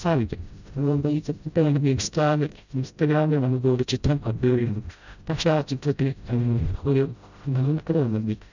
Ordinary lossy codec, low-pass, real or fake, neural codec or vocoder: none; 7.2 kHz; fake; codec, 16 kHz, 0.5 kbps, FreqCodec, smaller model